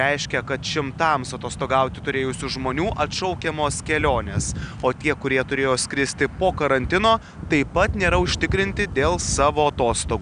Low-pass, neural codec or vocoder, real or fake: 9.9 kHz; none; real